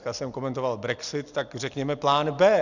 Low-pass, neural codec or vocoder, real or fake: 7.2 kHz; none; real